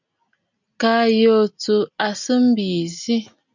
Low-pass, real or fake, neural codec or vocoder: 7.2 kHz; real; none